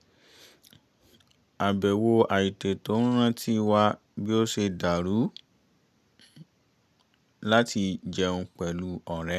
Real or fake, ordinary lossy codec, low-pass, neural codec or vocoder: real; none; 14.4 kHz; none